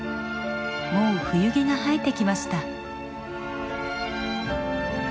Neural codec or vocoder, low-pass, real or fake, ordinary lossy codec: none; none; real; none